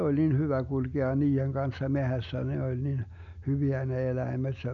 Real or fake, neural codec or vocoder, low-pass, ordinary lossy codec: real; none; 7.2 kHz; none